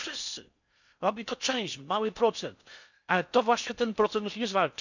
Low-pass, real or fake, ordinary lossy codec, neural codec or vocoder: 7.2 kHz; fake; none; codec, 16 kHz in and 24 kHz out, 0.6 kbps, FocalCodec, streaming, 4096 codes